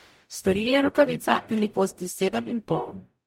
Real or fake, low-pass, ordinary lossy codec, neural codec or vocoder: fake; 19.8 kHz; MP3, 64 kbps; codec, 44.1 kHz, 0.9 kbps, DAC